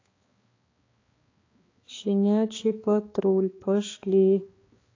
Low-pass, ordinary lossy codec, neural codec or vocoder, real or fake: 7.2 kHz; AAC, 32 kbps; codec, 16 kHz, 2 kbps, X-Codec, HuBERT features, trained on balanced general audio; fake